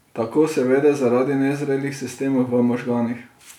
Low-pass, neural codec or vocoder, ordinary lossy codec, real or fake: 19.8 kHz; none; none; real